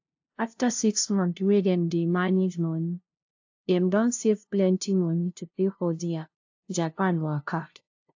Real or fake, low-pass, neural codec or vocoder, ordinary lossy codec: fake; 7.2 kHz; codec, 16 kHz, 0.5 kbps, FunCodec, trained on LibriTTS, 25 frames a second; AAC, 48 kbps